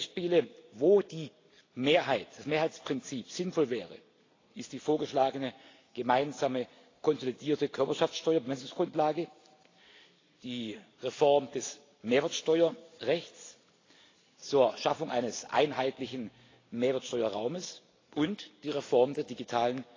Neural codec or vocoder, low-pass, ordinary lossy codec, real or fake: none; 7.2 kHz; AAC, 32 kbps; real